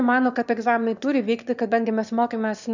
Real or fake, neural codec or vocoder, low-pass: fake; autoencoder, 22.05 kHz, a latent of 192 numbers a frame, VITS, trained on one speaker; 7.2 kHz